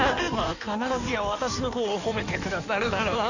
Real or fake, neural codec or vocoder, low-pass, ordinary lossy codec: fake; codec, 16 kHz in and 24 kHz out, 1.1 kbps, FireRedTTS-2 codec; 7.2 kHz; none